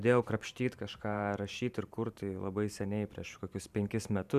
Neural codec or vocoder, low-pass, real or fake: vocoder, 44.1 kHz, 128 mel bands every 512 samples, BigVGAN v2; 14.4 kHz; fake